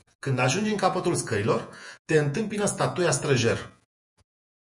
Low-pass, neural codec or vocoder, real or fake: 10.8 kHz; vocoder, 48 kHz, 128 mel bands, Vocos; fake